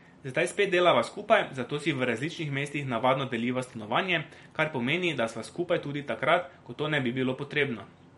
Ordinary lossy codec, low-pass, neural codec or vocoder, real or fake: MP3, 48 kbps; 19.8 kHz; vocoder, 44.1 kHz, 128 mel bands every 256 samples, BigVGAN v2; fake